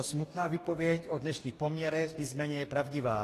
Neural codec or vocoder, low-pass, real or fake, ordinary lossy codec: codec, 44.1 kHz, 2.6 kbps, DAC; 14.4 kHz; fake; AAC, 48 kbps